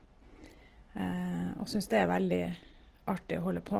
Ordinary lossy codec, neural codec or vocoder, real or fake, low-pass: Opus, 16 kbps; none; real; 14.4 kHz